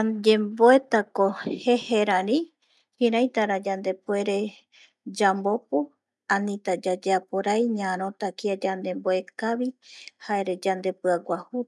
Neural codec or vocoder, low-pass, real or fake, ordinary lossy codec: none; none; real; none